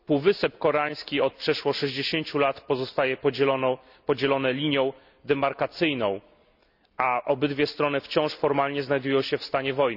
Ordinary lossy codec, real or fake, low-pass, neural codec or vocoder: none; real; 5.4 kHz; none